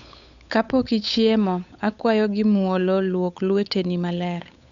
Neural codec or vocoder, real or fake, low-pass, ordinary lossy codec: codec, 16 kHz, 8 kbps, FunCodec, trained on Chinese and English, 25 frames a second; fake; 7.2 kHz; none